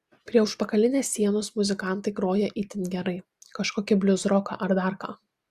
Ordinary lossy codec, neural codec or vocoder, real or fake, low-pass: Opus, 64 kbps; none; real; 14.4 kHz